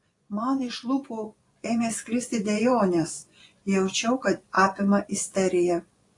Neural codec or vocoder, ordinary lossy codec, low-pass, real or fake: vocoder, 24 kHz, 100 mel bands, Vocos; AAC, 48 kbps; 10.8 kHz; fake